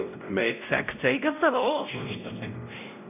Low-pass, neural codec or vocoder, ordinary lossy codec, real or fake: 3.6 kHz; codec, 16 kHz, 0.5 kbps, X-Codec, WavLM features, trained on Multilingual LibriSpeech; none; fake